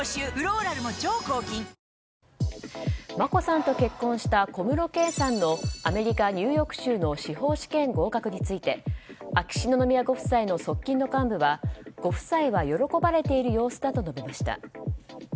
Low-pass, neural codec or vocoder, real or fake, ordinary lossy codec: none; none; real; none